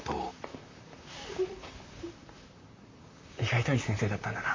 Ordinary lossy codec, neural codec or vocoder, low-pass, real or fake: MP3, 48 kbps; vocoder, 44.1 kHz, 128 mel bands, Pupu-Vocoder; 7.2 kHz; fake